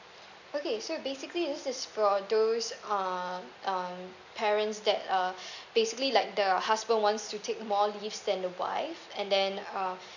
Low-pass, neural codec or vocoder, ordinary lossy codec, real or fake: 7.2 kHz; none; none; real